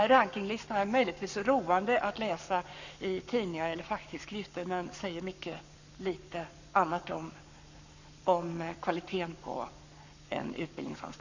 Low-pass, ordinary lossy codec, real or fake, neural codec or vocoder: 7.2 kHz; none; fake; codec, 44.1 kHz, 7.8 kbps, Pupu-Codec